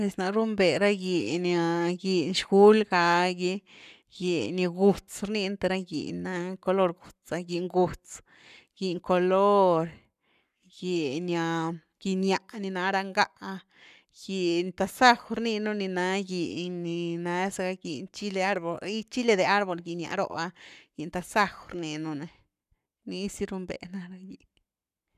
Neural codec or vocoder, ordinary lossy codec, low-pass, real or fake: codec, 44.1 kHz, 7.8 kbps, Pupu-Codec; none; 14.4 kHz; fake